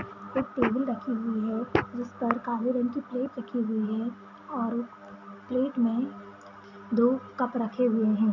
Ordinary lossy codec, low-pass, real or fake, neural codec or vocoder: none; 7.2 kHz; real; none